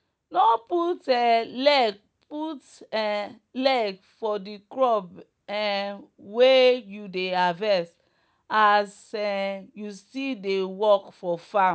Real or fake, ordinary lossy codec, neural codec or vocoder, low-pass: real; none; none; none